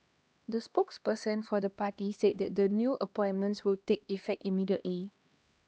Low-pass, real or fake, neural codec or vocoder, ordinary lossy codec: none; fake; codec, 16 kHz, 1 kbps, X-Codec, HuBERT features, trained on LibriSpeech; none